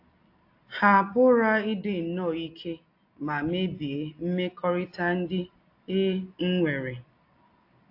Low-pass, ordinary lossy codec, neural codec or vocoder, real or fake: 5.4 kHz; AAC, 32 kbps; none; real